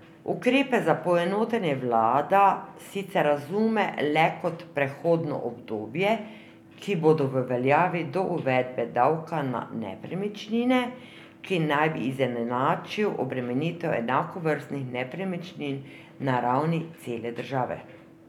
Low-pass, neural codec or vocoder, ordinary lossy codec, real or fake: 19.8 kHz; none; none; real